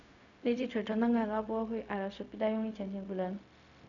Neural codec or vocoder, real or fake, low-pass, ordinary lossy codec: codec, 16 kHz, 0.4 kbps, LongCat-Audio-Codec; fake; 7.2 kHz; none